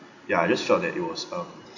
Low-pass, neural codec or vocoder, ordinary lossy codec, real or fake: 7.2 kHz; none; none; real